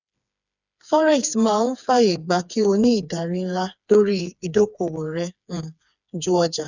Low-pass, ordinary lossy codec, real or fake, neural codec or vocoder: 7.2 kHz; none; fake; codec, 16 kHz, 4 kbps, FreqCodec, smaller model